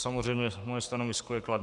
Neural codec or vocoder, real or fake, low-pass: codec, 44.1 kHz, 7.8 kbps, Pupu-Codec; fake; 10.8 kHz